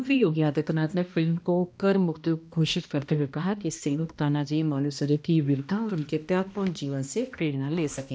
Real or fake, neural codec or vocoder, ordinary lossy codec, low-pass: fake; codec, 16 kHz, 1 kbps, X-Codec, HuBERT features, trained on balanced general audio; none; none